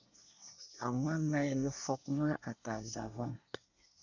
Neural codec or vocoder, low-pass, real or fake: codec, 24 kHz, 1 kbps, SNAC; 7.2 kHz; fake